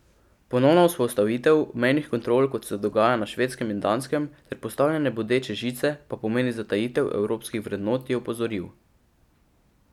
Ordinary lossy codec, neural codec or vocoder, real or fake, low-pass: none; none; real; 19.8 kHz